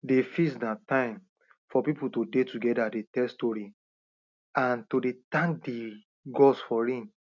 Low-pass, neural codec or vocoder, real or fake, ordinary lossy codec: 7.2 kHz; none; real; none